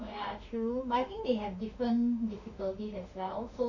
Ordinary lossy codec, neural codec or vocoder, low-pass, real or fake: none; autoencoder, 48 kHz, 32 numbers a frame, DAC-VAE, trained on Japanese speech; 7.2 kHz; fake